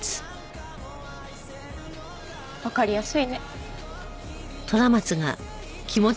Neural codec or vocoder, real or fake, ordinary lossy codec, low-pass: none; real; none; none